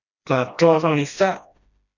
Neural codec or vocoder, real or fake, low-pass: codec, 16 kHz, 1 kbps, FreqCodec, smaller model; fake; 7.2 kHz